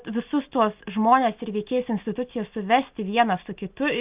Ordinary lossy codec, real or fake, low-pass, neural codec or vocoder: Opus, 24 kbps; real; 3.6 kHz; none